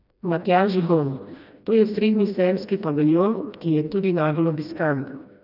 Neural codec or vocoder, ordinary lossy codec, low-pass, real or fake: codec, 16 kHz, 1 kbps, FreqCodec, smaller model; none; 5.4 kHz; fake